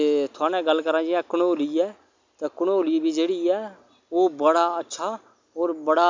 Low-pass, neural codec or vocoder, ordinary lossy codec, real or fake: 7.2 kHz; none; MP3, 64 kbps; real